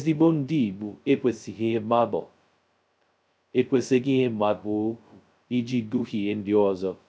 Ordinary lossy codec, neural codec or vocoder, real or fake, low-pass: none; codec, 16 kHz, 0.2 kbps, FocalCodec; fake; none